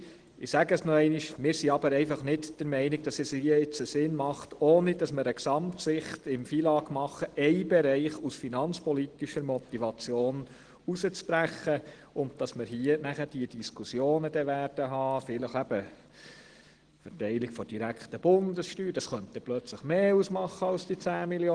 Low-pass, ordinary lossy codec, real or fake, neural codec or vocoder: 9.9 kHz; Opus, 16 kbps; real; none